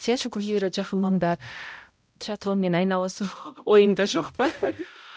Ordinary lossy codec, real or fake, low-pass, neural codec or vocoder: none; fake; none; codec, 16 kHz, 0.5 kbps, X-Codec, HuBERT features, trained on balanced general audio